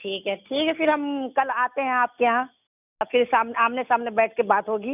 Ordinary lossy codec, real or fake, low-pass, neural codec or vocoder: none; real; 3.6 kHz; none